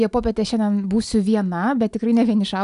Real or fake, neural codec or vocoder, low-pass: real; none; 10.8 kHz